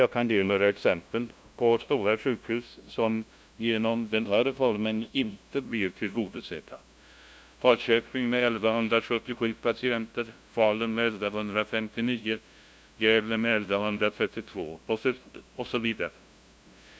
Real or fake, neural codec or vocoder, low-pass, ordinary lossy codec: fake; codec, 16 kHz, 0.5 kbps, FunCodec, trained on LibriTTS, 25 frames a second; none; none